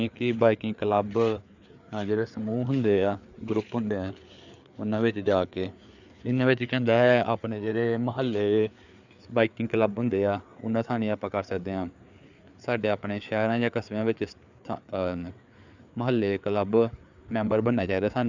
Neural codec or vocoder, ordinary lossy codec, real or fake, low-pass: codec, 16 kHz, 4 kbps, FreqCodec, larger model; none; fake; 7.2 kHz